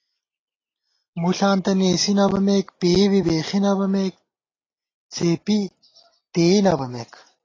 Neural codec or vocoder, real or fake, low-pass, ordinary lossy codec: none; real; 7.2 kHz; AAC, 32 kbps